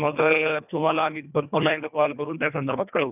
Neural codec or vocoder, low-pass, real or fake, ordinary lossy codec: codec, 24 kHz, 1.5 kbps, HILCodec; 3.6 kHz; fake; none